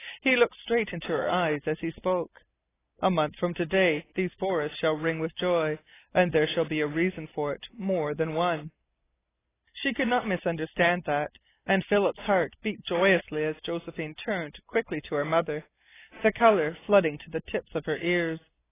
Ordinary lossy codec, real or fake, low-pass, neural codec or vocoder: AAC, 16 kbps; real; 3.6 kHz; none